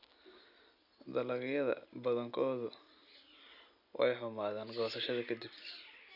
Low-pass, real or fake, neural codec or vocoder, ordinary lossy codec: 5.4 kHz; real; none; none